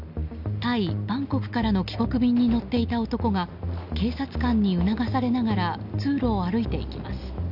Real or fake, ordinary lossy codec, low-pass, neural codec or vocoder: real; none; 5.4 kHz; none